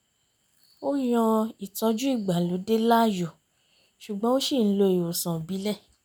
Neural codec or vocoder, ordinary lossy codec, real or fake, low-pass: none; none; real; none